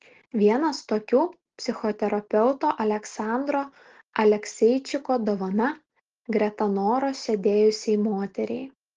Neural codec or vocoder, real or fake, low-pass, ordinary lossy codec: none; real; 7.2 kHz; Opus, 16 kbps